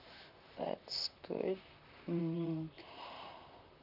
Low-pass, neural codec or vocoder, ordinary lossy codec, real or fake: 5.4 kHz; vocoder, 44.1 kHz, 128 mel bands, Pupu-Vocoder; none; fake